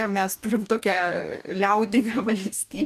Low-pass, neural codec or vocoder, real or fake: 14.4 kHz; codec, 44.1 kHz, 2.6 kbps, DAC; fake